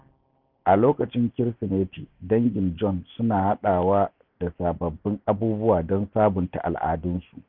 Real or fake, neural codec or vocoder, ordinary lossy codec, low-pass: real; none; none; 5.4 kHz